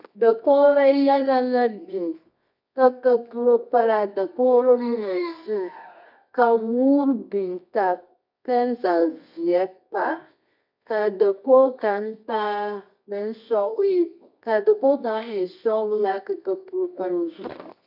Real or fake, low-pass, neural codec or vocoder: fake; 5.4 kHz; codec, 24 kHz, 0.9 kbps, WavTokenizer, medium music audio release